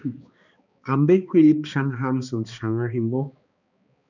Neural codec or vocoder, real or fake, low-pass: codec, 16 kHz, 2 kbps, X-Codec, HuBERT features, trained on balanced general audio; fake; 7.2 kHz